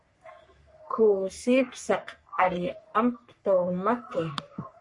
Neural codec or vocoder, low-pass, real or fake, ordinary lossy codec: codec, 44.1 kHz, 3.4 kbps, Pupu-Codec; 10.8 kHz; fake; MP3, 48 kbps